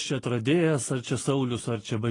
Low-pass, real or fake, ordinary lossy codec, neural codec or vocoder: 10.8 kHz; real; AAC, 32 kbps; none